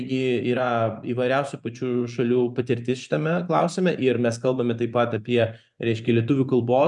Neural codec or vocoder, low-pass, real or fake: vocoder, 24 kHz, 100 mel bands, Vocos; 10.8 kHz; fake